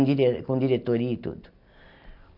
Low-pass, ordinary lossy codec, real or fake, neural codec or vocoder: 5.4 kHz; none; real; none